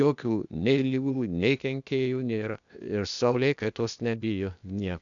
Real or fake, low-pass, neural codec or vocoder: fake; 7.2 kHz; codec, 16 kHz, 0.8 kbps, ZipCodec